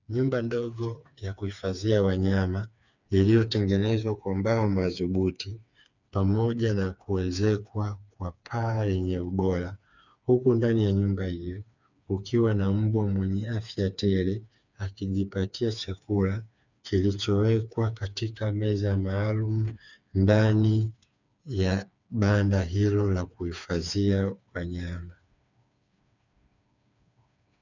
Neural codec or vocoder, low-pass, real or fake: codec, 16 kHz, 4 kbps, FreqCodec, smaller model; 7.2 kHz; fake